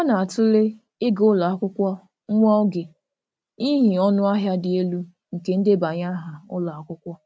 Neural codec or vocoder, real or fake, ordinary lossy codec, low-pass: none; real; none; none